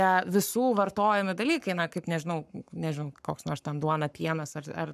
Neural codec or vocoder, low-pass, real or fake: codec, 44.1 kHz, 7.8 kbps, Pupu-Codec; 14.4 kHz; fake